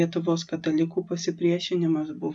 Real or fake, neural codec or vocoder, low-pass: real; none; 10.8 kHz